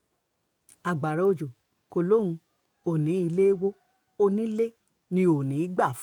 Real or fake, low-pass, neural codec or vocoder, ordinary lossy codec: fake; 19.8 kHz; codec, 44.1 kHz, 7.8 kbps, DAC; MP3, 96 kbps